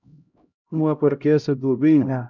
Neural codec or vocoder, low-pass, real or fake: codec, 16 kHz, 0.5 kbps, X-Codec, HuBERT features, trained on LibriSpeech; 7.2 kHz; fake